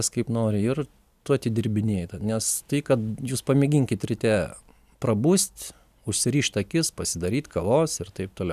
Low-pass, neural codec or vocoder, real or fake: 14.4 kHz; none; real